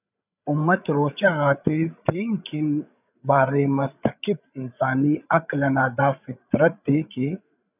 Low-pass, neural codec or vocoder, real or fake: 3.6 kHz; codec, 16 kHz, 8 kbps, FreqCodec, larger model; fake